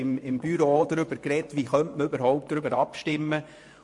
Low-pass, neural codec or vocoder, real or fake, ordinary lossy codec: 10.8 kHz; vocoder, 24 kHz, 100 mel bands, Vocos; fake; MP3, 64 kbps